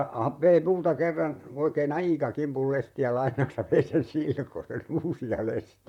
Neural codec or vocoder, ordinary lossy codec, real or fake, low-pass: vocoder, 44.1 kHz, 128 mel bands, Pupu-Vocoder; MP3, 96 kbps; fake; 19.8 kHz